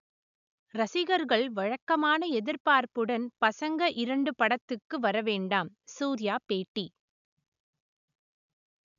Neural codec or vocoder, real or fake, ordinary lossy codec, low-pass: none; real; none; 7.2 kHz